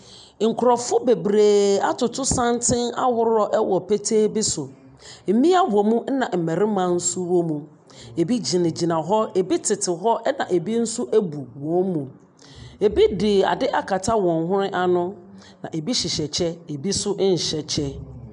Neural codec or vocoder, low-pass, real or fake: none; 9.9 kHz; real